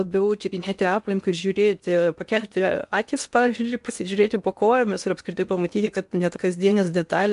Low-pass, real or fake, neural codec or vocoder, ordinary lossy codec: 10.8 kHz; fake; codec, 16 kHz in and 24 kHz out, 0.8 kbps, FocalCodec, streaming, 65536 codes; MP3, 64 kbps